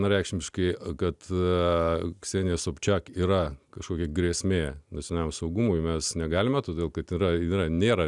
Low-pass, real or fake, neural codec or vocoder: 10.8 kHz; real; none